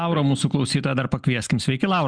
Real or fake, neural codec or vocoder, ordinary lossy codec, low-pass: real; none; Opus, 64 kbps; 9.9 kHz